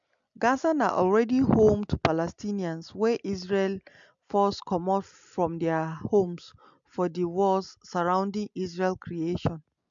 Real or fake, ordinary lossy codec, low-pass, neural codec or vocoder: real; MP3, 64 kbps; 7.2 kHz; none